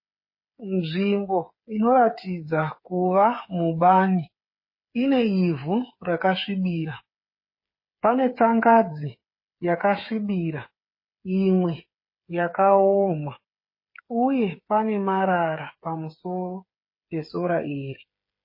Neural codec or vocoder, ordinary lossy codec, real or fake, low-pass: codec, 16 kHz, 8 kbps, FreqCodec, smaller model; MP3, 24 kbps; fake; 5.4 kHz